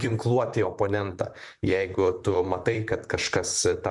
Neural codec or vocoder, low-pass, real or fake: vocoder, 44.1 kHz, 128 mel bands, Pupu-Vocoder; 10.8 kHz; fake